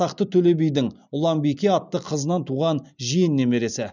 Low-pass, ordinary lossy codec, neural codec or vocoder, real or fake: 7.2 kHz; none; none; real